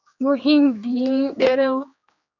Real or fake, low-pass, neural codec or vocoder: fake; 7.2 kHz; codec, 16 kHz, 2 kbps, X-Codec, HuBERT features, trained on general audio